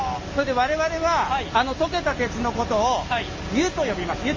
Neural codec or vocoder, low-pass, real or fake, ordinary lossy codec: none; 7.2 kHz; real; Opus, 32 kbps